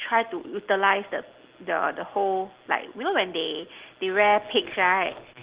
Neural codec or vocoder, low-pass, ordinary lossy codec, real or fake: none; 3.6 kHz; Opus, 16 kbps; real